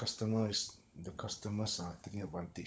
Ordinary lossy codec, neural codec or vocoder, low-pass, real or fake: none; codec, 16 kHz, 16 kbps, FunCodec, trained on LibriTTS, 50 frames a second; none; fake